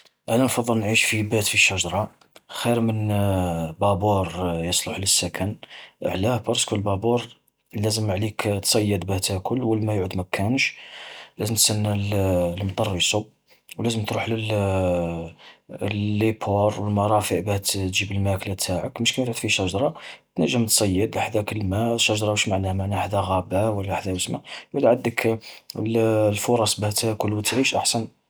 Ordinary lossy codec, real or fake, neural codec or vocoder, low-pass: none; real; none; none